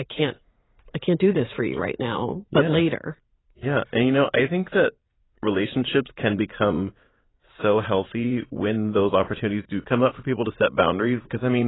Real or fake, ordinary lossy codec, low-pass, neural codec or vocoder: fake; AAC, 16 kbps; 7.2 kHz; vocoder, 44.1 kHz, 80 mel bands, Vocos